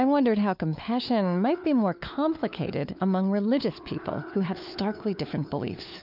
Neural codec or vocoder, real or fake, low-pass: codec, 16 kHz, 4 kbps, FunCodec, trained on LibriTTS, 50 frames a second; fake; 5.4 kHz